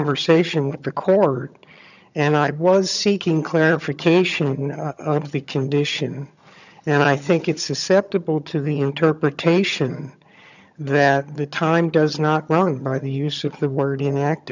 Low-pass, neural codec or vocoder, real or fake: 7.2 kHz; vocoder, 22.05 kHz, 80 mel bands, HiFi-GAN; fake